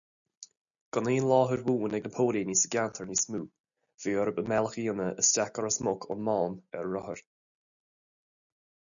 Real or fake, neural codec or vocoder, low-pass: real; none; 7.2 kHz